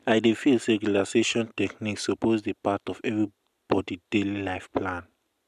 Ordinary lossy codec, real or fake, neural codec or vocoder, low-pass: MP3, 96 kbps; real; none; 14.4 kHz